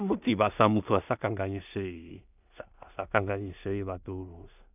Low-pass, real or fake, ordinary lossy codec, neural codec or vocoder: 3.6 kHz; fake; none; codec, 16 kHz in and 24 kHz out, 0.4 kbps, LongCat-Audio-Codec, two codebook decoder